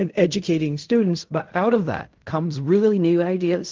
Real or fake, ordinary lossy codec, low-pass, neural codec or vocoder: fake; Opus, 32 kbps; 7.2 kHz; codec, 16 kHz in and 24 kHz out, 0.4 kbps, LongCat-Audio-Codec, fine tuned four codebook decoder